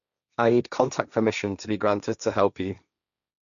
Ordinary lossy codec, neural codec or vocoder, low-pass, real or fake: none; codec, 16 kHz, 1.1 kbps, Voila-Tokenizer; 7.2 kHz; fake